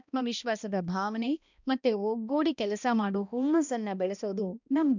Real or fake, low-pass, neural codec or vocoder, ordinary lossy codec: fake; 7.2 kHz; codec, 16 kHz, 1 kbps, X-Codec, HuBERT features, trained on balanced general audio; none